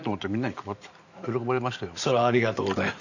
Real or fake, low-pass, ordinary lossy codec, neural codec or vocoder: fake; 7.2 kHz; none; vocoder, 44.1 kHz, 128 mel bands every 512 samples, BigVGAN v2